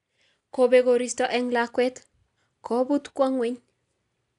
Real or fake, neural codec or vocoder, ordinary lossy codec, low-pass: real; none; none; 10.8 kHz